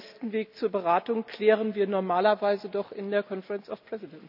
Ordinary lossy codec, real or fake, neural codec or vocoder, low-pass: none; real; none; 5.4 kHz